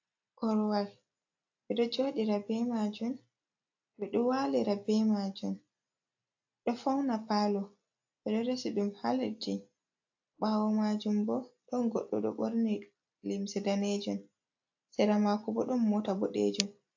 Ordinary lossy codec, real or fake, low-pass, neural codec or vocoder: AAC, 48 kbps; real; 7.2 kHz; none